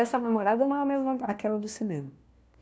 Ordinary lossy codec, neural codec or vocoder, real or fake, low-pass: none; codec, 16 kHz, 1 kbps, FunCodec, trained on LibriTTS, 50 frames a second; fake; none